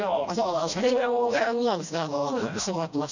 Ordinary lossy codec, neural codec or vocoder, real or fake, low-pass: none; codec, 16 kHz, 1 kbps, FreqCodec, smaller model; fake; 7.2 kHz